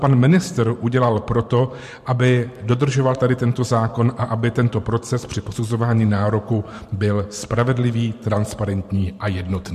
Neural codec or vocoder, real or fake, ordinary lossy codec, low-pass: vocoder, 44.1 kHz, 128 mel bands every 512 samples, BigVGAN v2; fake; MP3, 64 kbps; 14.4 kHz